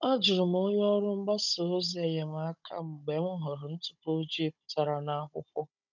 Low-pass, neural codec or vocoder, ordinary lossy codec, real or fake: 7.2 kHz; codec, 16 kHz, 16 kbps, FunCodec, trained on Chinese and English, 50 frames a second; none; fake